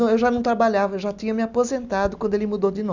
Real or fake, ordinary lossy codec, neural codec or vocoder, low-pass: real; none; none; 7.2 kHz